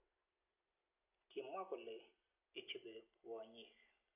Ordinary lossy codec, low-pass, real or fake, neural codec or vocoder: none; 3.6 kHz; real; none